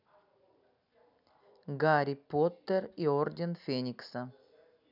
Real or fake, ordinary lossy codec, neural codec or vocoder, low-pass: real; none; none; 5.4 kHz